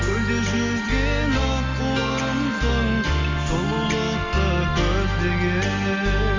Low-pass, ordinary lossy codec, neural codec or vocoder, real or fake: 7.2 kHz; MP3, 64 kbps; none; real